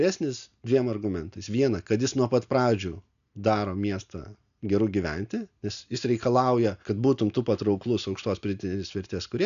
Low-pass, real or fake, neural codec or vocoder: 7.2 kHz; real; none